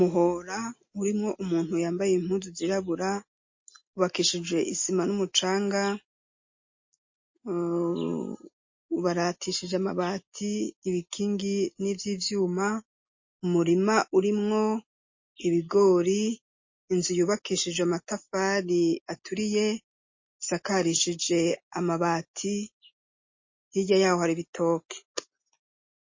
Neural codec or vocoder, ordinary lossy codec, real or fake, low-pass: none; MP3, 32 kbps; real; 7.2 kHz